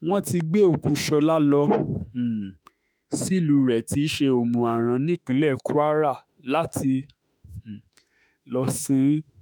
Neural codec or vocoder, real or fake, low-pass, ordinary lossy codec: autoencoder, 48 kHz, 32 numbers a frame, DAC-VAE, trained on Japanese speech; fake; none; none